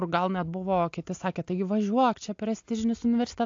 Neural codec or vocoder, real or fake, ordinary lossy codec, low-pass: none; real; AAC, 48 kbps; 7.2 kHz